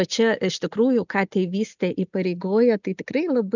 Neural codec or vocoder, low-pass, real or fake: none; 7.2 kHz; real